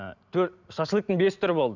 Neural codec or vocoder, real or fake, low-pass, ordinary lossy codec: none; real; 7.2 kHz; none